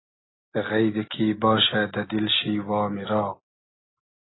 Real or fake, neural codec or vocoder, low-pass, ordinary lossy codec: real; none; 7.2 kHz; AAC, 16 kbps